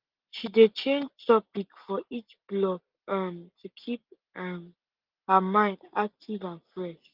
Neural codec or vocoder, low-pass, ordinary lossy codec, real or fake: none; 5.4 kHz; Opus, 32 kbps; real